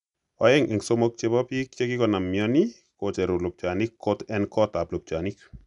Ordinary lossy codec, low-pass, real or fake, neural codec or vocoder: none; 10.8 kHz; real; none